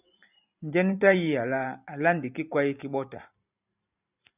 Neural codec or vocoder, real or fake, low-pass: vocoder, 44.1 kHz, 128 mel bands every 512 samples, BigVGAN v2; fake; 3.6 kHz